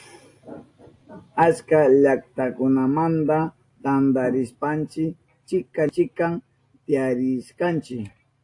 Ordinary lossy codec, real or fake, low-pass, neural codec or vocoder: AAC, 48 kbps; real; 10.8 kHz; none